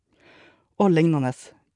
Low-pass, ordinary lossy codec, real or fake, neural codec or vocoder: 10.8 kHz; none; fake; vocoder, 44.1 kHz, 128 mel bands every 512 samples, BigVGAN v2